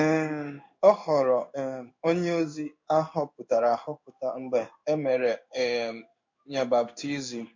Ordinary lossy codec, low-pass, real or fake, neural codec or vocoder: MP3, 48 kbps; 7.2 kHz; fake; codec, 16 kHz in and 24 kHz out, 1 kbps, XY-Tokenizer